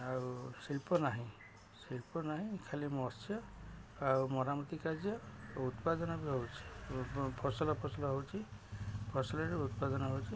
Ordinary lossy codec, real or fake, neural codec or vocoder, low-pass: none; real; none; none